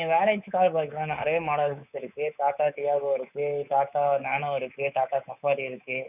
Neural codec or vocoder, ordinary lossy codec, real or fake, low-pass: codec, 24 kHz, 3.1 kbps, DualCodec; none; fake; 3.6 kHz